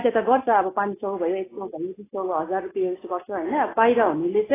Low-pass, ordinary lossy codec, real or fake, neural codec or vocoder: 3.6 kHz; AAC, 16 kbps; real; none